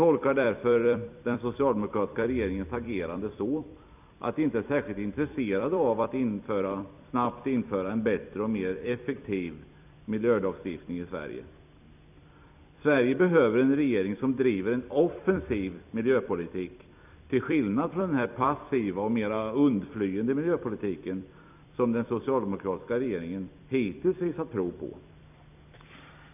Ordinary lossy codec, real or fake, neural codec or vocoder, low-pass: none; fake; vocoder, 44.1 kHz, 128 mel bands every 256 samples, BigVGAN v2; 3.6 kHz